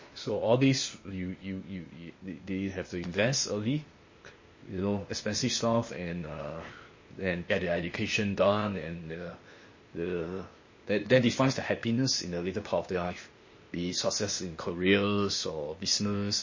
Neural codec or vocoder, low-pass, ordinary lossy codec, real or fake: codec, 16 kHz, 0.8 kbps, ZipCodec; 7.2 kHz; MP3, 32 kbps; fake